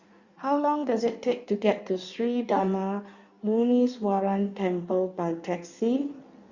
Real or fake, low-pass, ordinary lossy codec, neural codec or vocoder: fake; 7.2 kHz; Opus, 64 kbps; codec, 16 kHz in and 24 kHz out, 1.1 kbps, FireRedTTS-2 codec